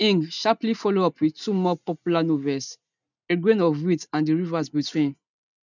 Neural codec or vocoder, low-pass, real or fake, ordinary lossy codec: none; 7.2 kHz; real; none